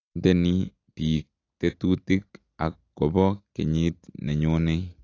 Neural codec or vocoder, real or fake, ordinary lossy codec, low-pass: none; real; AAC, 32 kbps; 7.2 kHz